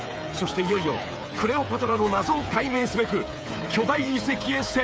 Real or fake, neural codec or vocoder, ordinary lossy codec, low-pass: fake; codec, 16 kHz, 8 kbps, FreqCodec, smaller model; none; none